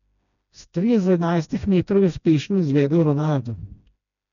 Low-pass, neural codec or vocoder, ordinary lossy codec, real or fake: 7.2 kHz; codec, 16 kHz, 1 kbps, FreqCodec, smaller model; none; fake